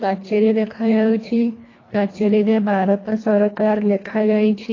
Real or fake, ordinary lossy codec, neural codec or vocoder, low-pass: fake; AAC, 32 kbps; codec, 24 kHz, 1.5 kbps, HILCodec; 7.2 kHz